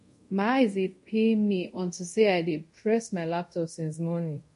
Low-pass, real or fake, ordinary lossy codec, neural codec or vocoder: 10.8 kHz; fake; MP3, 48 kbps; codec, 24 kHz, 0.5 kbps, DualCodec